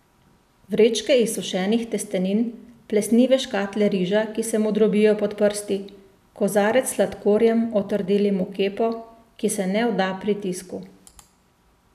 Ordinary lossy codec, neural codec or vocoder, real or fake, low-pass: none; none; real; 14.4 kHz